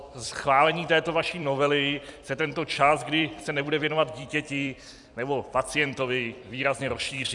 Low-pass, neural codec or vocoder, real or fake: 10.8 kHz; vocoder, 44.1 kHz, 128 mel bands every 256 samples, BigVGAN v2; fake